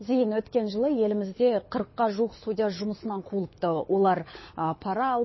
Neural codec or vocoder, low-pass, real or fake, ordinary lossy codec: codec, 24 kHz, 6 kbps, HILCodec; 7.2 kHz; fake; MP3, 24 kbps